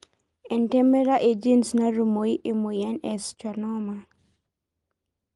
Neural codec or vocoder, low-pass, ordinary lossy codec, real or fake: none; 10.8 kHz; Opus, 32 kbps; real